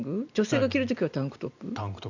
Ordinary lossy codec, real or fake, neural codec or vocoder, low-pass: none; real; none; 7.2 kHz